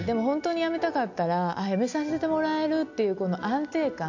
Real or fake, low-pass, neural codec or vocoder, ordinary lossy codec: real; 7.2 kHz; none; AAC, 48 kbps